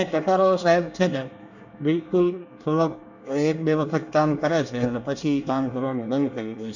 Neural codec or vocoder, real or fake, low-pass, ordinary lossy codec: codec, 24 kHz, 1 kbps, SNAC; fake; 7.2 kHz; none